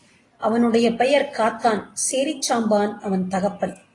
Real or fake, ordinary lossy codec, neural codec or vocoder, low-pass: real; AAC, 32 kbps; none; 10.8 kHz